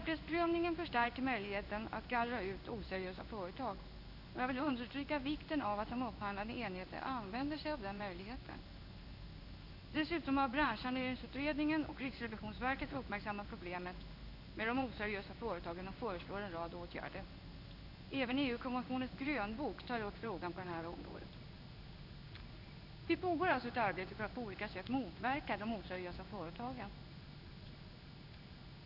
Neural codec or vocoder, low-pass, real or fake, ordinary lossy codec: codec, 16 kHz in and 24 kHz out, 1 kbps, XY-Tokenizer; 5.4 kHz; fake; none